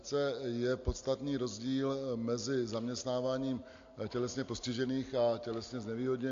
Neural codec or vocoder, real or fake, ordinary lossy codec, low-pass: none; real; AAC, 48 kbps; 7.2 kHz